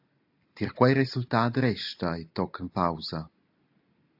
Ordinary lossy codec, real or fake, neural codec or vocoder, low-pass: AAC, 48 kbps; real; none; 5.4 kHz